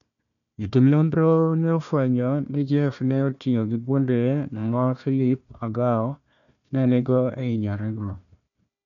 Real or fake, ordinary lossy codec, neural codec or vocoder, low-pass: fake; none; codec, 16 kHz, 1 kbps, FunCodec, trained on Chinese and English, 50 frames a second; 7.2 kHz